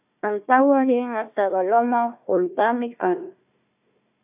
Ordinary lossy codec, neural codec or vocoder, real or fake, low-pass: AAC, 32 kbps; codec, 16 kHz, 1 kbps, FunCodec, trained on Chinese and English, 50 frames a second; fake; 3.6 kHz